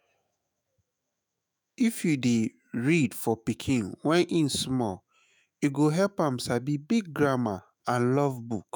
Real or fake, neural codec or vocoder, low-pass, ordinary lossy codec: fake; autoencoder, 48 kHz, 128 numbers a frame, DAC-VAE, trained on Japanese speech; none; none